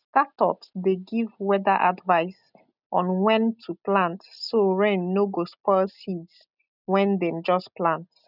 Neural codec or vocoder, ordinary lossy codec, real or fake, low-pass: none; none; real; 5.4 kHz